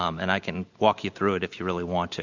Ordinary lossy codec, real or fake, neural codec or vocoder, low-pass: Opus, 64 kbps; real; none; 7.2 kHz